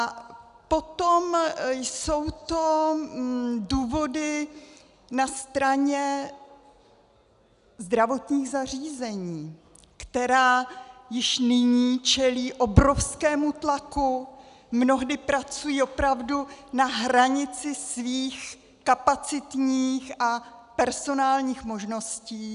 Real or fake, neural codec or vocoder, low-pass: real; none; 10.8 kHz